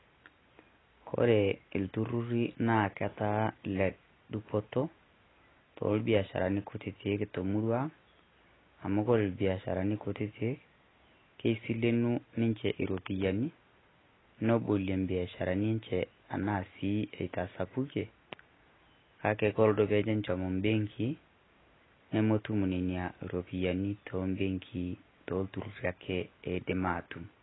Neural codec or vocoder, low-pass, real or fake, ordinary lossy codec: none; 7.2 kHz; real; AAC, 16 kbps